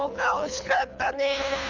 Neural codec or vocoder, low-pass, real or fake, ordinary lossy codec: codec, 24 kHz, 3 kbps, HILCodec; 7.2 kHz; fake; none